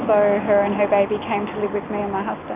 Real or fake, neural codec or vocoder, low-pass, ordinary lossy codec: real; none; 3.6 kHz; AAC, 24 kbps